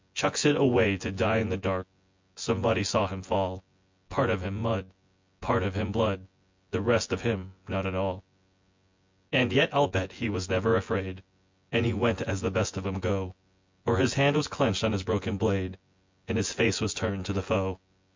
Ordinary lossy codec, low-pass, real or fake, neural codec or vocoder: MP3, 64 kbps; 7.2 kHz; fake; vocoder, 24 kHz, 100 mel bands, Vocos